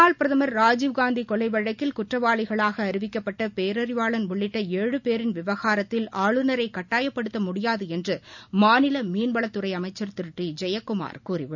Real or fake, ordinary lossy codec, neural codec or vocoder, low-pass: real; none; none; 7.2 kHz